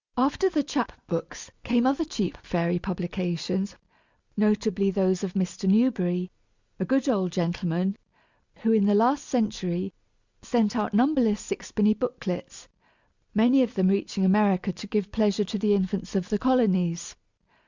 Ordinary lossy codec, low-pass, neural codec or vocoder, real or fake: Opus, 64 kbps; 7.2 kHz; none; real